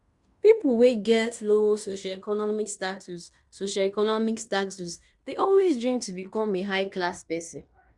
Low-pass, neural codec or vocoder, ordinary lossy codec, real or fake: 10.8 kHz; codec, 16 kHz in and 24 kHz out, 0.9 kbps, LongCat-Audio-Codec, fine tuned four codebook decoder; Opus, 64 kbps; fake